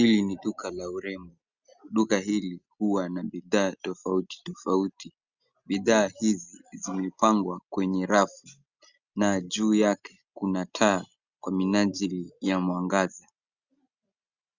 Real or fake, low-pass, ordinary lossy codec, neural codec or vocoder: real; 7.2 kHz; Opus, 64 kbps; none